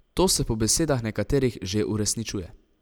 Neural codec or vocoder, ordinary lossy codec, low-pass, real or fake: none; none; none; real